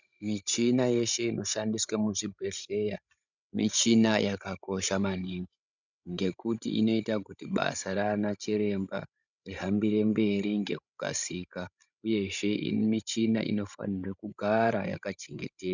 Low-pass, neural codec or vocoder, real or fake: 7.2 kHz; codec, 16 kHz, 16 kbps, FreqCodec, larger model; fake